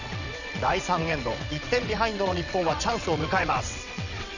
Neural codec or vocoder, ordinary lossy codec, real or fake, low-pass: vocoder, 22.05 kHz, 80 mel bands, WaveNeXt; none; fake; 7.2 kHz